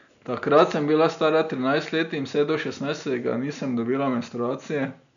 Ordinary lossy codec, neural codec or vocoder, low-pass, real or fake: none; none; 7.2 kHz; real